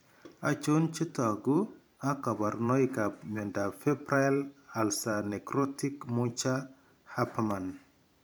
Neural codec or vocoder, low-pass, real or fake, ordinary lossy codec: vocoder, 44.1 kHz, 128 mel bands every 512 samples, BigVGAN v2; none; fake; none